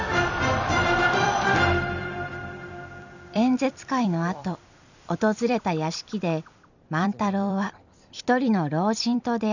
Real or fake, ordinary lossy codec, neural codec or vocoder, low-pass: fake; none; vocoder, 44.1 kHz, 128 mel bands every 256 samples, BigVGAN v2; 7.2 kHz